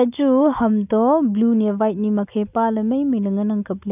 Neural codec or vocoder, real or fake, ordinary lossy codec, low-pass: none; real; none; 3.6 kHz